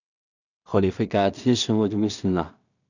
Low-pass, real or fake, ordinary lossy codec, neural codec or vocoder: 7.2 kHz; fake; none; codec, 16 kHz in and 24 kHz out, 0.4 kbps, LongCat-Audio-Codec, two codebook decoder